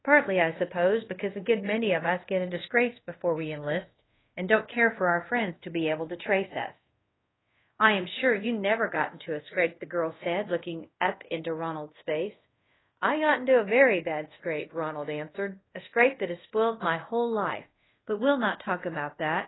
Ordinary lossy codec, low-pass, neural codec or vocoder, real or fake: AAC, 16 kbps; 7.2 kHz; codec, 24 kHz, 0.5 kbps, DualCodec; fake